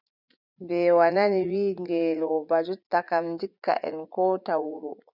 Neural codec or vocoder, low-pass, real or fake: vocoder, 44.1 kHz, 80 mel bands, Vocos; 5.4 kHz; fake